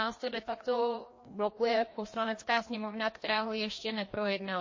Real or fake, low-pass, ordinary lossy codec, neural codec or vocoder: fake; 7.2 kHz; MP3, 32 kbps; codec, 16 kHz, 1 kbps, FreqCodec, larger model